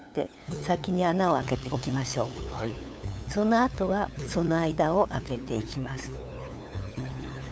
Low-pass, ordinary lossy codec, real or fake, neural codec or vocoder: none; none; fake; codec, 16 kHz, 8 kbps, FunCodec, trained on LibriTTS, 25 frames a second